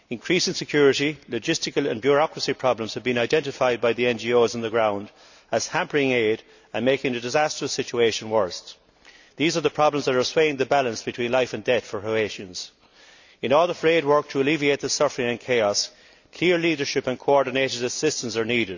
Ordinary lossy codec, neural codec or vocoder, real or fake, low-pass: none; none; real; 7.2 kHz